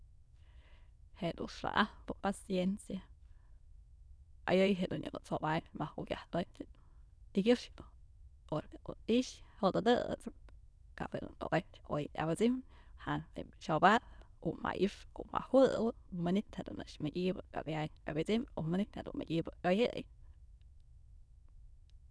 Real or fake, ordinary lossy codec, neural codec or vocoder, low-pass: fake; none; autoencoder, 22.05 kHz, a latent of 192 numbers a frame, VITS, trained on many speakers; none